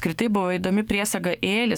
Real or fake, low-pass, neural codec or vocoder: fake; 19.8 kHz; codec, 44.1 kHz, 7.8 kbps, DAC